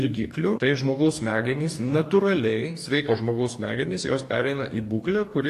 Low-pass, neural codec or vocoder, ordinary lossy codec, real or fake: 14.4 kHz; codec, 44.1 kHz, 2.6 kbps, DAC; AAC, 64 kbps; fake